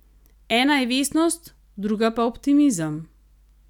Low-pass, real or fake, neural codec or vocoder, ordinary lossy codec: 19.8 kHz; real; none; none